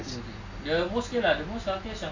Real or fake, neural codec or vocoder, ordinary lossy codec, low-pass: real; none; AAC, 48 kbps; 7.2 kHz